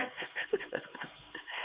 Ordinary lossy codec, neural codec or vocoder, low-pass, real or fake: Opus, 64 kbps; codec, 16 kHz, 4 kbps, X-Codec, HuBERT features, trained on LibriSpeech; 3.6 kHz; fake